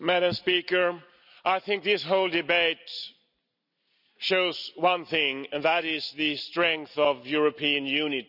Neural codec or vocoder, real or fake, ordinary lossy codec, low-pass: none; real; none; 5.4 kHz